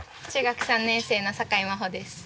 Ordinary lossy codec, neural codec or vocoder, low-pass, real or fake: none; none; none; real